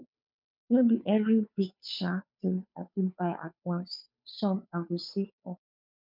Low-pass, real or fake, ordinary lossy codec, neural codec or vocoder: 5.4 kHz; fake; MP3, 48 kbps; codec, 16 kHz, 2 kbps, FunCodec, trained on Chinese and English, 25 frames a second